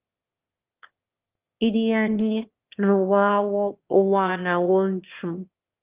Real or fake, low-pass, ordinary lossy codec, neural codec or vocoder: fake; 3.6 kHz; Opus, 32 kbps; autoencoder, 22.05 kHz, a latent of 192 numbers a frame, VITS, trained on one speaker